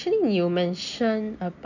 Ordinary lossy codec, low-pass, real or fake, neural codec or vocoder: none; 7.2 kHz; real; none